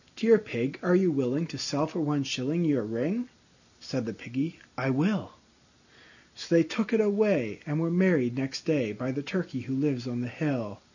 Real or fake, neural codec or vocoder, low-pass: real; none; 7.2 kHz